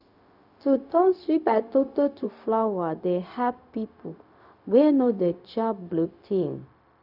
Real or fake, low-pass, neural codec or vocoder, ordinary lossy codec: fake; 5.4 kHz; codec, 16 kHz, 0.4 kbps, LongCat-Audio-Codec; none